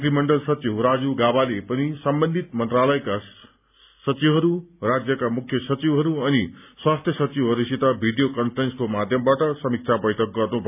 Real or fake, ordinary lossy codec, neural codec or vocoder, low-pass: real; none; none; 3.6 kHz